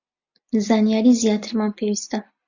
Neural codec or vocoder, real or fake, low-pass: none; real; 7.2 kHz